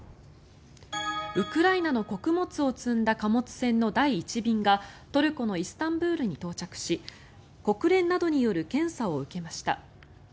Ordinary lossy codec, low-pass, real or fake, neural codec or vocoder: none; none; real; none